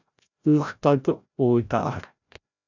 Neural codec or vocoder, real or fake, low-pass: codec, 16 kHz, 0.5 kbps, FreqCodec, larger model; fake; 7.2 kHz